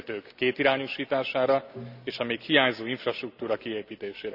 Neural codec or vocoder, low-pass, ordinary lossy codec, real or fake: none; 5.4 kHz; none; real